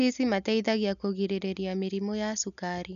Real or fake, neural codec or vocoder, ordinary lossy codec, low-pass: real; none; none; 7.2 kHz